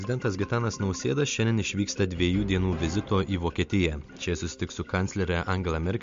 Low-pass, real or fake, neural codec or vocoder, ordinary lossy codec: 7.2 kHz; real; none; MP3, 48 kbps